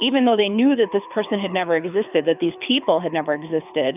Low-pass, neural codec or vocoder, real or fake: 3.6 kHz; codec, 24 kHz, 6 kbps, HILCodec; fake